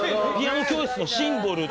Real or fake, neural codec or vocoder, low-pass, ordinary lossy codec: real; none; none; none